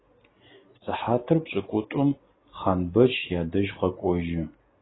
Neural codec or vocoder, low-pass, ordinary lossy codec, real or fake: none; 7.2 kHz; AAC, 16 kbps; real